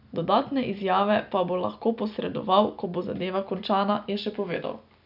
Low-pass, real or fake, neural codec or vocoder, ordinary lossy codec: 5.4 kHz; real; none; none